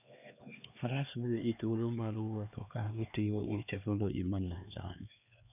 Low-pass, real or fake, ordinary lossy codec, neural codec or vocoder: 3.6 kHz; fake; none; codec, 16 kHz, 2 kbps, X-Codec, HuBERT features, trained on LibriSpeech